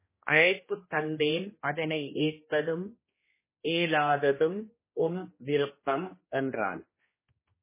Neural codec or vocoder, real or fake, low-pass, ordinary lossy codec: codec, 16 kHz, 1 kbps, X-Codec, HuBERT features, trained on general audio; fake; 3.6 kHz; MP3, 16 kbps